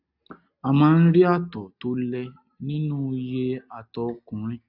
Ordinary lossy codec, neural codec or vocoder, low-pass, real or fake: none; none; 5.4 kHz; real